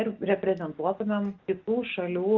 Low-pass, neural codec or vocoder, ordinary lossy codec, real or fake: 7.2 kHz; none; Opus, 32 kbps; real